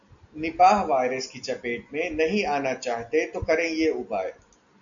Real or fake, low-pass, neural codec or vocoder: real; 7.2 kHz; none